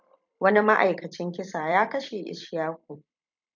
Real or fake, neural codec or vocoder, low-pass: fake; vocoder, 44.1 kHz, 128 mel bands every 256 samples, BigVGAN v2; 7.2 kHz